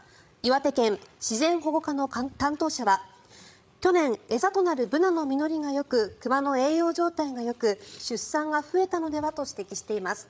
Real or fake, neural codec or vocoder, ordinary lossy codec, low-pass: fake; codec, 16 kHz, 8 kbps, FreqCodec, larger model; none; none